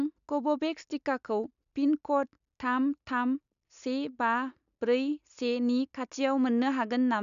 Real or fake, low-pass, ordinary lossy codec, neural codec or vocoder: real; 7.2 kHz; none; none